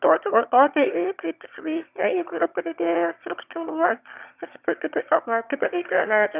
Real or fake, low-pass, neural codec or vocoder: fake; 3.6 kHz; autoencoder, 22.05 kHz, a latent of 192 numbers a frame, VITS, trained on one speaker